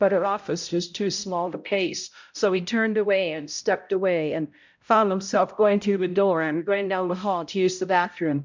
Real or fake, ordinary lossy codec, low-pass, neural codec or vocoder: fake; MP3, 64 kbps; 7.2 kHz; codec, 16 kHz, 0.5 kbps, X-Codec, HuBERT features, trained on balanced general audio